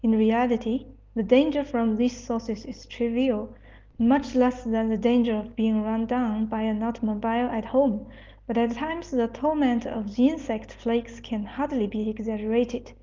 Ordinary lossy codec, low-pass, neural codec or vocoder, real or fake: Opus, 24 kbps; 7.2 kHz; none; real